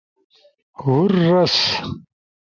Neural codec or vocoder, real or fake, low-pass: none; real; 7.2 kHz